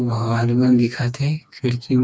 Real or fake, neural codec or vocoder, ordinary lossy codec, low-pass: fake; codec, 16 kHz, 2 kbps, FreqCodec, smaller model; none; none